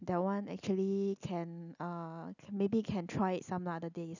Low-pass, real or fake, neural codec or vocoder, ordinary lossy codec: 7.2 kHz; real; none; none